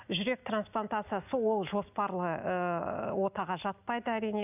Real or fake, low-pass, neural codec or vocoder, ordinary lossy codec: real; 3.6 kHz; none; none